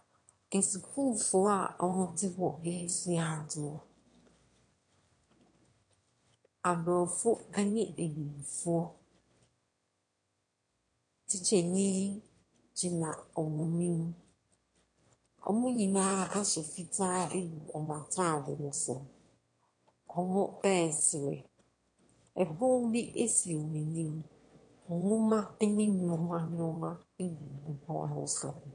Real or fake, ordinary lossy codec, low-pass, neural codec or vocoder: fake; MP3, 48 kbps; 9.9 kHz; autoencoder, 22.05 kHz, a latent of 192 numbers a frame, VITS, trained on one speaker